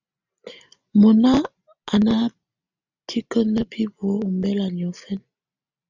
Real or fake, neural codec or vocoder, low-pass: real; none; 7.2 kHz